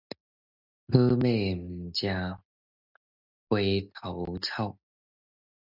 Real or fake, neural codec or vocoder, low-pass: real; none; 5.4 kHz